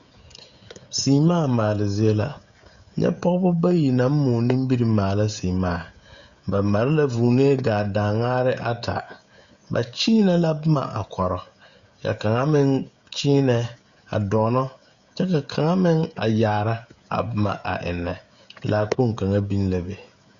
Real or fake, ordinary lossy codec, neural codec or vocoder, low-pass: fake; Opus, 64 kbps; codec, 16 kHz, 16 kbps, FreqCodec, smaller model; 7.2 kHz